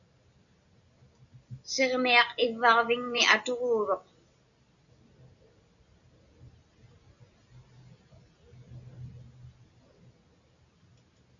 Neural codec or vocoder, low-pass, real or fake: none; 7.2 kHz; real